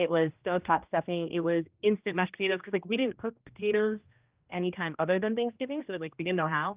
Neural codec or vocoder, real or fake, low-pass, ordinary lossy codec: codec, 16 kHz, 1 kbps, X-Codec, HuBERT features, trained on general audio; fake; 3.6 kHz; Opus, 24 kbps